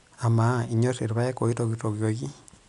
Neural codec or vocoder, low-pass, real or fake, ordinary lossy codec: none; 10.8 kHz; real; none